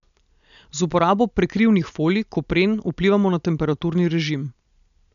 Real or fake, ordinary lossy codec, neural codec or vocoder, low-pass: real; none; none; 7.2 kHz